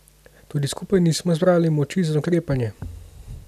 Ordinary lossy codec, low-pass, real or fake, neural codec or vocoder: none; 14.4 kHz; real; none